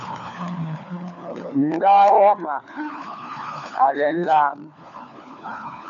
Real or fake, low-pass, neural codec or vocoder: fake; 7.2 kHz; codec, 16 kHz, 4 kbps, FunCodec, trained on LibriTTS, 50 frames a second